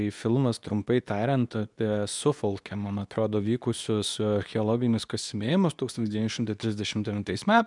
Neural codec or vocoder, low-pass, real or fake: codec, 24 kHz, 0.9 kbps, WavTokenizer, medium speech release version 2; 10.8 kHz; fake